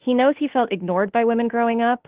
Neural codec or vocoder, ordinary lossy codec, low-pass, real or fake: autoencoder, 48 kHz, 32 numbers a frame, DAC-VAE, trained on Japanese speech; Opus, 16 kbps; 3.6 kHz; fake